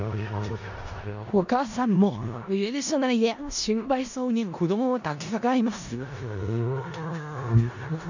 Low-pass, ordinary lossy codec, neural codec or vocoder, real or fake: 7.2 kHz; none; codec, 16 kHz in and 24 kHz out, 0.4 kbps, LongCat-Audio-Codec, four codebook decoder; fake